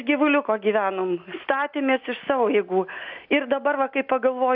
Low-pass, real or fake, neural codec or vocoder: 5.4 kHz; real; none